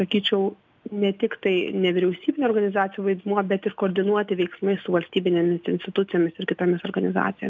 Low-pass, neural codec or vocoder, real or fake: 7.2 kHz; none; real